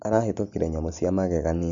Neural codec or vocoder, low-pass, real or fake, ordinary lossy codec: none; 7.2 kHz; real; MP3, 48 kbps